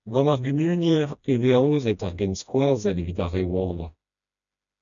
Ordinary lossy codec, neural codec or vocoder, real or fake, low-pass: MP3, 96 kbps; codec, 16 kHz, 1 kbps, FreqCodec, smaller model; fake; 7.2 kHz